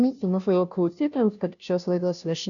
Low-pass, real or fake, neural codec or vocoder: 7.2 kHz; fake; codec, 16 kHz, 0.5 kbps, FunCodec, trained on Chinese and English, 25 frames a second